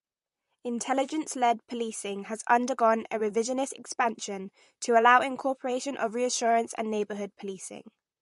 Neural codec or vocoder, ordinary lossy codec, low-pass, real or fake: vocoder, 44.1 kHz, 128 mel bands, Pupu-Vocoder; MP3, 48 kbps; 14.4 kHz; fake